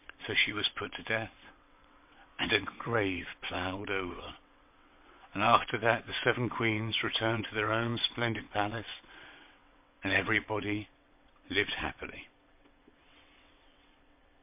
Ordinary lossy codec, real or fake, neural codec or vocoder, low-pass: MP3, 32 kbps; fake; vocoder, 22.05 kHz, 80 mel bands, WaveNeXt; 3.6 kHz